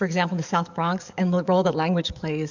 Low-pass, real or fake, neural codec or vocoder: 7.2 kHz; fake; codec, 44.1 kHz, 7.8 kbps, DAC